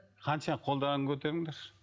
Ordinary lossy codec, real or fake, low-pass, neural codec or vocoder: none; real; none; none